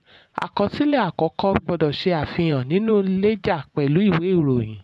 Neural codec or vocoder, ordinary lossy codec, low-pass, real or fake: vocoder, 24 kHz, 100 mel bands, Vocos; none; none; fake